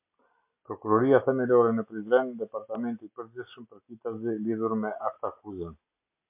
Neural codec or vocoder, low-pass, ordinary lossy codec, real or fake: none; 3.6 kHz; MP3, 32 kbps; real